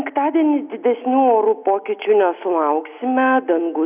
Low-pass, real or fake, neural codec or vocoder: 3.6 kHz; real; none